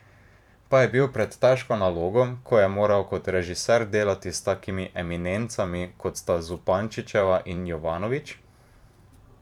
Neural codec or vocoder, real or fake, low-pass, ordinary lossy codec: vocoder, 44.1 kHz, 128 mel bands every 512 samples, BigVGAN v2; fake; 19.8 kHz; none